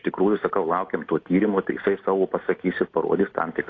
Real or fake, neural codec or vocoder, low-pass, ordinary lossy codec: real; none; 7.2 kHz; AAC, 32 kbps